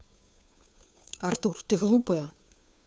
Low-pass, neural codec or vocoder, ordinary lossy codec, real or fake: none; codec, 16 kHz, 2 kbps, FunCodec, trained on Chinese and English, 25 frames a second; none; fake